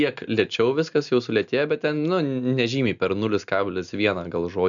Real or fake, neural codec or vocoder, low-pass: real; none; 7.2 kHz